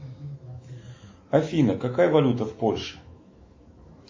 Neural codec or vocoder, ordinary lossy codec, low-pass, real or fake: autoencoder, 48 kHz, 128 numbers a frame, DAC-VAE, trained on Japanese speech; MP3, 32 kbps; 7.2 kHz; fake